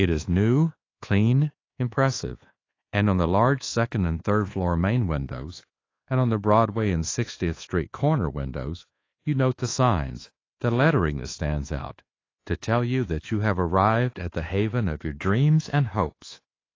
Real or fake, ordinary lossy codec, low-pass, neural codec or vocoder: fake; AAC, 32 kbps; 7.2 kHz; codec, 24 kHz, 1.2 kbps, DualCodec